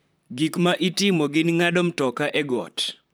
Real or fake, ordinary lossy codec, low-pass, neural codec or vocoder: fake; none; none; vocoder, 44.1 kHz, 128 mel bands, Pupu-Vocoder